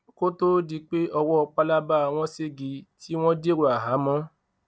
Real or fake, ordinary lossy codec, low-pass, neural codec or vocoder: real; none; none; none